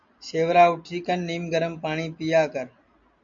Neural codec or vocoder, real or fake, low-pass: none; real; 7.2 kHz